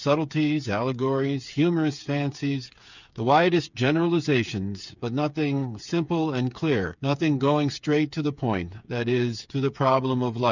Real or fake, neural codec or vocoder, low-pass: fake; codec, 16 kHz, 8 kbps, FreqCodec, smaller model; 7.2 kHz